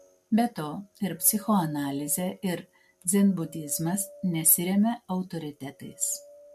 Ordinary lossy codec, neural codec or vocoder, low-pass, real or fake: MP3, 64 kbps; none; 14.4 kHz; real